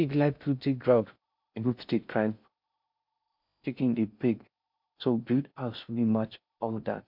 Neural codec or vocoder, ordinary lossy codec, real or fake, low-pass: codec, 16 kHz in and 24 kHz out, 0.6 kbps, FocalCodec, streaming, 2048 codes; MP3, 48 kbps; fake; 5.4 kHz